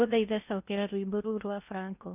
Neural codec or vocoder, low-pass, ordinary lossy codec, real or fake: codec, 16 kHz in and 24 kHz out, 0.8 kbps, FocalCodec, streaming, 65536 codes; 3.6 kHz; none; fake